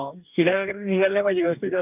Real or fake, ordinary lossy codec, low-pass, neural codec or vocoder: fake; none; 3.6 kHz; codec, 44.1 kHz, 2.6 kbps, DAC